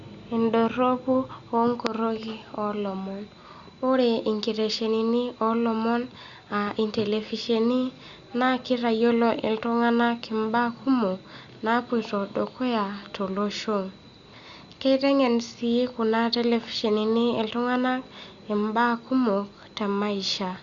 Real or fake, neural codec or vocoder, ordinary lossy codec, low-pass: real; none; Opus, 64 kbps; 7.2 kHz